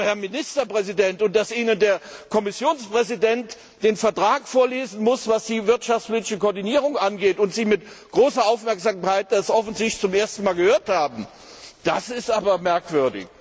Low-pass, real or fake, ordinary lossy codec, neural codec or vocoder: none; real; none; none